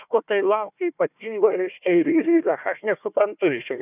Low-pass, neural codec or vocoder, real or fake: 3.6 kHz; codec, 16 kHz, 1 kbps, FunCodec, trained on Chinese and English, 50 frames a second; fake